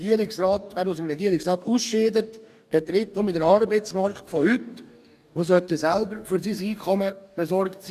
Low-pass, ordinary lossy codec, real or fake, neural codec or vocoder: 14.4 kHz; none; fake; codec, 44.1 kHz, 2.6 kbps, DAC